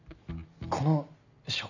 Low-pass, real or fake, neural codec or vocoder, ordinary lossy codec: 7.2 kHz; real; none; none